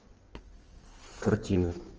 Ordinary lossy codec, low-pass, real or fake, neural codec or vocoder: Opus, 24 kbps; 7.2 kHz; fake; codec, 16 kHz in and 24 kHz out, 1.1 kbps, FireRedTTS-2 codec